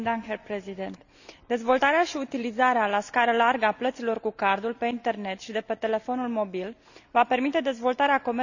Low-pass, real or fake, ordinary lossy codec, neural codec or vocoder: 7.2 kHz; real; none; none